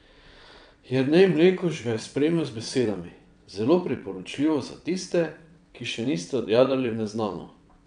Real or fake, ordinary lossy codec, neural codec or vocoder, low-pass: fake; none; vocoder, 22.05 kHz, 80 mel bands, WaveNeXt; 9.9 kHz